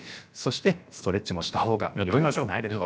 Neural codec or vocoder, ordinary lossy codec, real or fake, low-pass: codec, 16 kHz, about 1 kbps, DyCAST, with the encoder's durations; none; fake; none